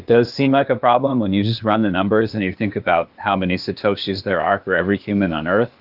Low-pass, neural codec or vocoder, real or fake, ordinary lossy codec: 5.4 kHz; codec, 16 kHz, 0.8 kbps, ZipCodec; fake; Opus, 24 kbps